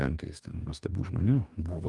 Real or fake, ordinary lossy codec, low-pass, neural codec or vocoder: fake; Opus, 32 kbps; 10.8 kHz; codec, 44.1 kHz, 2.6 kbps, DAC